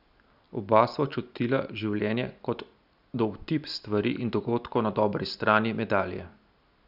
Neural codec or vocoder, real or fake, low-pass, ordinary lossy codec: none; real; 5.4 kHz; none